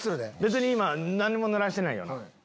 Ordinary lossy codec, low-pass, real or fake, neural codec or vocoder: none; none; real; none